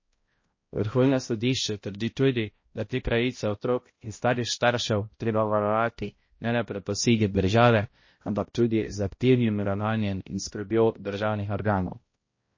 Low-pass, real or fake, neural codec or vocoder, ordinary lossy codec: 7.2 kHz; fake; codec, 16 kHz, 0.5 kbps, X-Codec, HuBERT features, trained on balanced general audio; MP3, 32 kbps